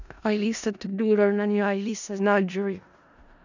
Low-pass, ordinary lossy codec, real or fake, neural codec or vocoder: 7.2 kHz; none; fake; codec, 16 kHz in and 24 kHz out, 0.4 kbps, LongCat-Audio-Codec, four codebook decoder